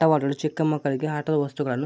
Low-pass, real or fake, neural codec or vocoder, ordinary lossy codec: none; real; none; none